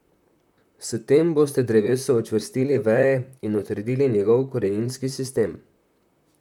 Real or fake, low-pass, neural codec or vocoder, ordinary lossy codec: fake; 19.8 kHz; vocoder, 44.1 kHz, 128 mel bands, Pupu-Vocoder; none